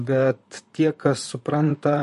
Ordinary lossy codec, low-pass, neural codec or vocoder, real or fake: MP3, 48 kbps; 14.4 kHz; vocoder, 44.1 kHz, 128 mel bands, Pupu-Vocoder; fake